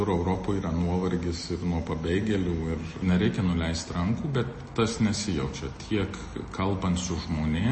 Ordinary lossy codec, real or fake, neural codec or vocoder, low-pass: MP3, 32 kbps; real; none; 10.8 kHz